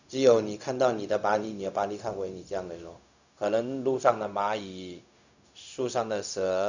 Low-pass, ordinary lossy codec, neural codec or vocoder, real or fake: 7.2 kHz; none; codec, 16 kHz, 0.4 kbps, LongCat-Audio-Codec; fake